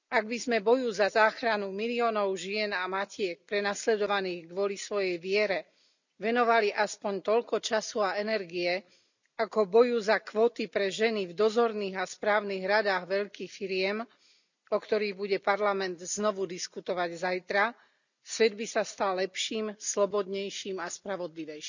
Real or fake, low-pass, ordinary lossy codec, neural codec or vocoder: real; 7.2 kHz; none; none